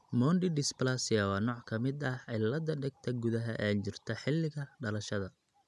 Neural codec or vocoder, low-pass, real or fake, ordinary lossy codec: none; none; real; none